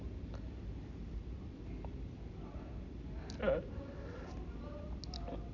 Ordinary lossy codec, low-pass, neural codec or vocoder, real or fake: AAC, 48 kbps; 7.2 kHz; none; real